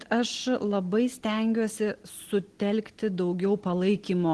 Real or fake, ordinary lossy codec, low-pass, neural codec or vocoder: real; Opus, 16 kbps; 10.8 kHz; none